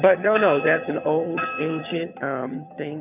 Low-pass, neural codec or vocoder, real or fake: 3.6 kHz; vocoder, 22.05 kHz, 80 mel bands, HiFi-GAN; fake